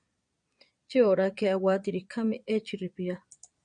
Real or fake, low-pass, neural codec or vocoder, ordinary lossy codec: fake; 9.9 kHz; vocoder, 22.05 kHz, 80 mel bands, Vocos; MP3, 96 kbps